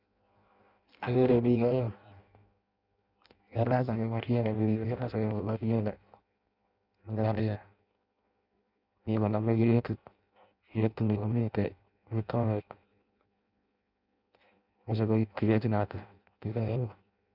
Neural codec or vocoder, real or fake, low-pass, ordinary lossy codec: codec, 16 kHz in and 24 kHz out, 0.6 kbps, FireRedTTS-2 codec; fake; 5.4 kHz; Opus, 64 kbps